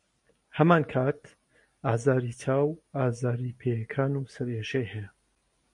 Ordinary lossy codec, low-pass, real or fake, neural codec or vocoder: MP3, 48 kbps; 10.8 kHz; real; none